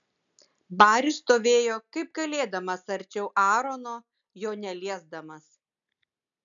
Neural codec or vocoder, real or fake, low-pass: none; real; 7.2 kHz